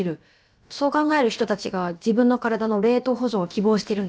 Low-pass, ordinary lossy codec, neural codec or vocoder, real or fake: none; none; codec, 16 kHz, about 1 kbps, DyCAST, with the encoder's durations; fake